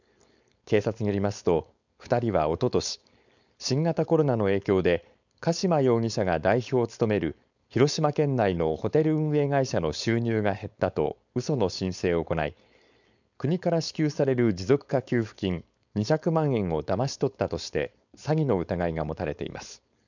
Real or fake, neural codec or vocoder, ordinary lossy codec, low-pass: fake; codec, 16 kHz, 4.8 kbps, FACodec; none; 7.2 kHz